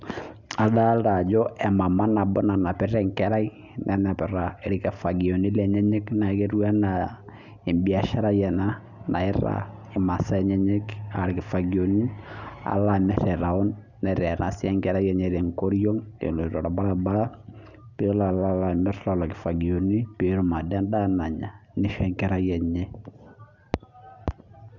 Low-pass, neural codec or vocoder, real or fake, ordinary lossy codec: 7.2 kHz; none; real; none